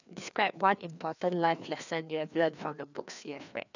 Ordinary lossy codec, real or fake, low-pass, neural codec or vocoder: none; fake; 7.2 kHz; codec, 16 kHz, 2 kbps, FreqCodec, larger model